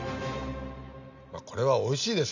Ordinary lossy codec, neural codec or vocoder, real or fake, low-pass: none; none; real; 7.2 kHz